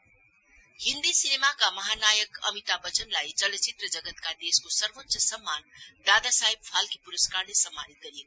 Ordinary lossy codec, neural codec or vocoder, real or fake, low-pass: none; none; real; none